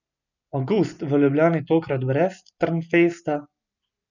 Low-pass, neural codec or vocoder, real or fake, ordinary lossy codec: 7.2 kHz; none; real; none